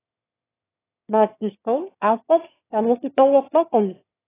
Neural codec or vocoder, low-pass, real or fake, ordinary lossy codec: autoencoder, 22.05 kHz, a latent of 192 numbers a frame, VITS, trained on one speaker; 3.6 kHz; fake; AAC, 16 kbps